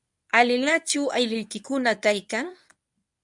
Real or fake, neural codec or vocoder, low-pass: fake; codec, 24 kHz, 0.9 kbps, WavTokenizer, medium speech release version 1; 10.8 kHz